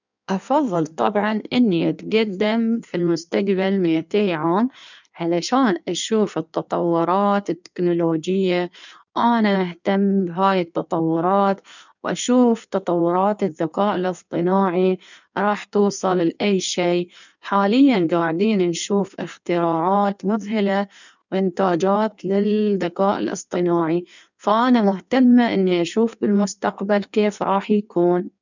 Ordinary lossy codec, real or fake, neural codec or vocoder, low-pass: none; fake; codec, 16 kHz in and 24 kHz out, 1.1 kbps, FireRedTTS-2 codec; 7.2 kHz